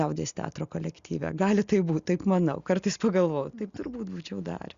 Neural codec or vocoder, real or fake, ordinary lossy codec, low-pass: none; real; Opus, 64 kbps; 7.2 kHz